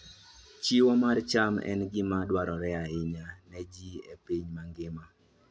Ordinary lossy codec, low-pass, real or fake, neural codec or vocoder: none; none; real; none